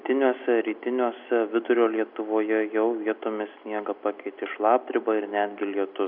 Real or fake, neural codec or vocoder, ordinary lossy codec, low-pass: real; none; AAC, 48 kbps; 5.4 kHz